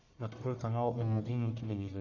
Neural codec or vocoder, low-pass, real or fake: codec, 44.1 kHz, 1.7 kbps, Pupu-Codec; 7.2 kHz; fake